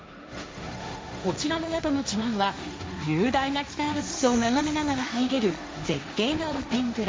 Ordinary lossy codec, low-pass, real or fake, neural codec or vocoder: none; none; fake; codec, 16 kHz, 1.1 kbps, Voila-Tokenizer